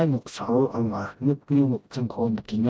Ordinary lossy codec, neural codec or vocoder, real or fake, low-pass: none; codec, 16 kHz, 0.5 kbps, FreqCodec, smaller model; fake; none